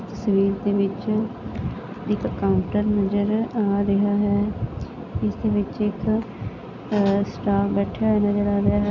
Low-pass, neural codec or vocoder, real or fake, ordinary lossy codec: 7.2 kHz; none; real; none